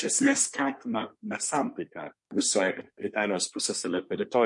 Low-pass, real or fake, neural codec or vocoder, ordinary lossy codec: 10.8 kHz; fake; codec, 24 kHz, 1 kbps, SNAC; MP3, 48 kbps